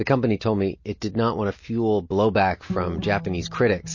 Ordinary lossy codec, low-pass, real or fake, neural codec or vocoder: MP3, 32 kbps; 7.2 kHz; real; none